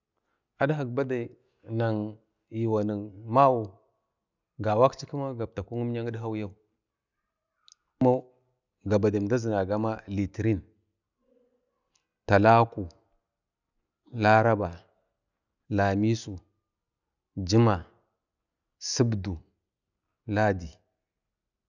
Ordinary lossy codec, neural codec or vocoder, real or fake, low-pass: none; none; real; 7.2 kHz